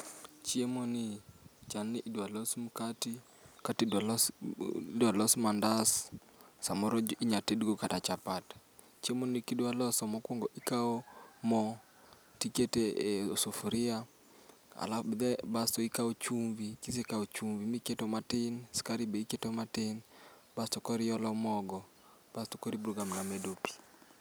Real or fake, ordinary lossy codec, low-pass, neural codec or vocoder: real; none; none; none